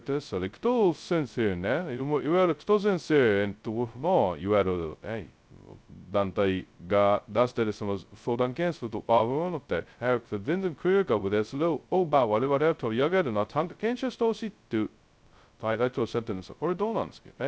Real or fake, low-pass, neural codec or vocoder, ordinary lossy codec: fake; none; codec, 16 kHz, 0.2 kbps, FocalCodec; none